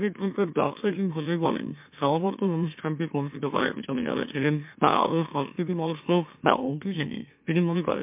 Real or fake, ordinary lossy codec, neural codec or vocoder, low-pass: fake; MP3, 32 kbps; autoencoder, 44.1 kHz, a latent of 192 numbers a frame, MeloTTS; 3.6 kHz